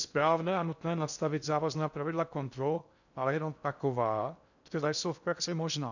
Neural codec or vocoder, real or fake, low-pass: codec, 16 kHz in and 24 kHz out, 0.6 kbps, FocalCodec, streaming, 4096 codes; fake; 7.2 kHz